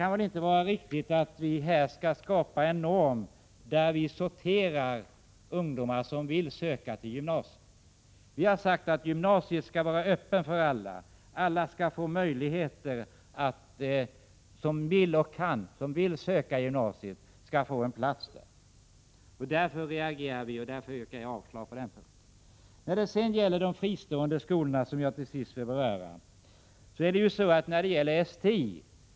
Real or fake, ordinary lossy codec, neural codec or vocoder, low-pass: real; none; none; none